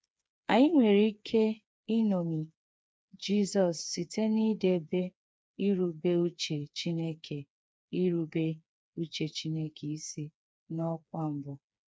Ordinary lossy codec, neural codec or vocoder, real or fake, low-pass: none; codec, 16 kHz, 4 kbps, FreqCodec, smaller model; fake; none